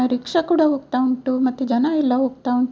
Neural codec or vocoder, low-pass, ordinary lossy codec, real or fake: none; none; none; real